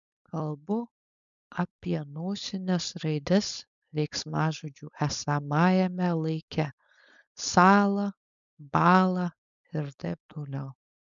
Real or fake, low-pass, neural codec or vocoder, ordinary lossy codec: fake; 7.2 kHz; codec, 16 kHz, 4.8 kbps, FACodec; MP3, 96 kbps